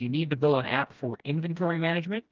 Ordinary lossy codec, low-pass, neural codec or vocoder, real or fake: Opus, 24 kbps; 7.2 kHz; codec, 16 kHz, 1 kbps, FreqCodec, smaller model; fake